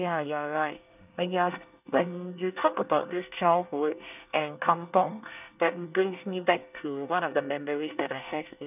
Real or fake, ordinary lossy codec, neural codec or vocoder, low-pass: fake; none; codec, 24 kHz, 1 kbps, SNAC; 3.6 kHz